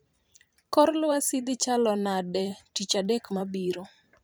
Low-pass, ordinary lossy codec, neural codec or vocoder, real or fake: none; none; vocoder, 44.1 kHz, 128 mel bands every 512 samples, BigVGAN v2; fake